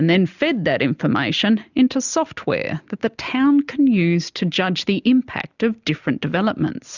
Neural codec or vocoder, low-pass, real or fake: none; 7.2 kHz; real